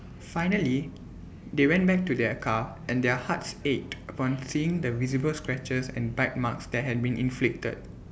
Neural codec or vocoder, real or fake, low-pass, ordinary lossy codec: none; real; none; none